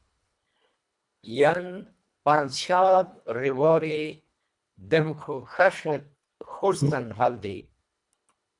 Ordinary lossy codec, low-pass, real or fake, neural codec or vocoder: MP3, 96 kbps; 10.8 kHz; fake; codec, 24 kHz, 1.5 kbps, HILCodec